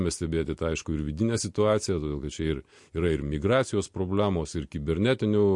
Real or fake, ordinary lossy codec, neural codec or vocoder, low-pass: real; MP3, 48 kbps; none; 10.8 kHz